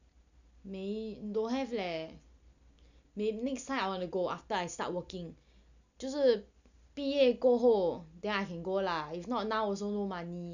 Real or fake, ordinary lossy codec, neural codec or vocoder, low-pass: real; none; none; 7.2 kHz